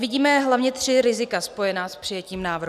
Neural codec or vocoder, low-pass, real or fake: none; 14.4 kHz; real